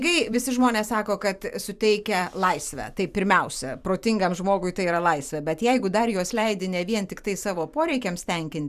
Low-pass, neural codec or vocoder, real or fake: 14.4 kHz; vocoder, 44.1 kHz, 128 mel bands every 512 samples, BigVGAN v2; fake